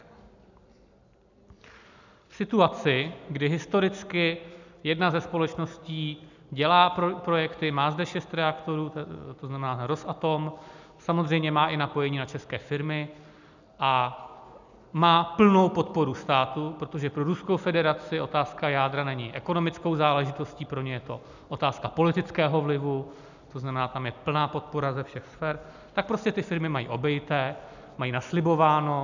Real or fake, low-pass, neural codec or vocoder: real; 7.2 kHz; none